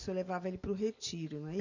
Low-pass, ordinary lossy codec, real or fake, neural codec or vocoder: 7.2 kHz; none; real; none